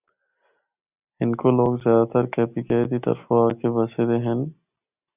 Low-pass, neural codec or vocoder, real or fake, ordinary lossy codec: 3.6 kHz; none; real; Opus, 64 kbps